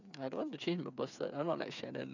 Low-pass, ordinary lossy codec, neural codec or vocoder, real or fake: 7.2 kHz; none; codec, 16 kHz, 8 kbps, FreqCodec, smaller model; fake